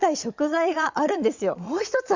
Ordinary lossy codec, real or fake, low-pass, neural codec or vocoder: Opus, 64 kbps; fake; 7.2 kHz; vocoder, 22.05 kHz, 80 mel bands, Vocos